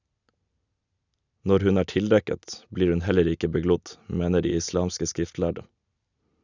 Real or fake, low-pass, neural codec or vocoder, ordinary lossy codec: real; 7.2 kHz; none; none